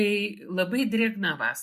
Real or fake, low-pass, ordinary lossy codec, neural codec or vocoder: real; 14.4 kHz; MP3, 64 kbps; none